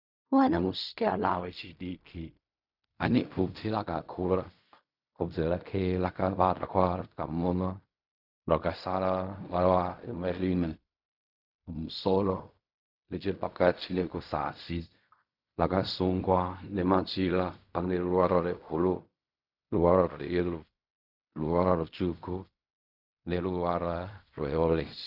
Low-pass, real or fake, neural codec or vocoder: 5.4 kHz; fake; codec, 16 kHz in and 24 kHz out, 0.4 kbps, LongCat-Audio-Codec, fine tuned four codebook decoder